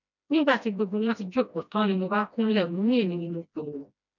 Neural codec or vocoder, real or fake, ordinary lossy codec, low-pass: codec, 16 kHz, 1 kbps, FreqCodec, smaller model; fake; none; 7.2 kHz